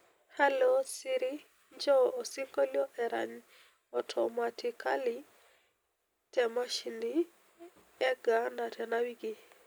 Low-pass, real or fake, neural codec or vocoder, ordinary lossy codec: none; real; none; none